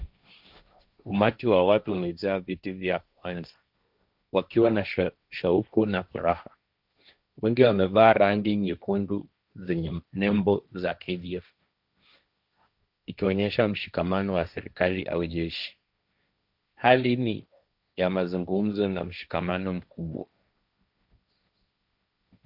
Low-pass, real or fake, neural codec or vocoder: 5.4 kHz; fake; codec, 16 kHz, 1.1 kbps, Voila-Tokenizer